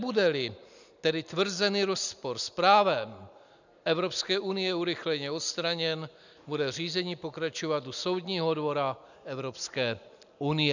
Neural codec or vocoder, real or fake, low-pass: none; real; 7.2 kHz